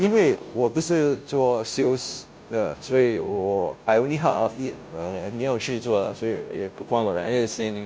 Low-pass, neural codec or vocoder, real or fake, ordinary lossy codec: none; codec, 16 kHz, 0.5 kbps, FunCodec, trained on Chinese and English, 25 frames a second; fake; none